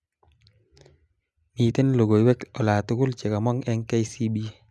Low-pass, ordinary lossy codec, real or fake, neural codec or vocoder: none; none; real; none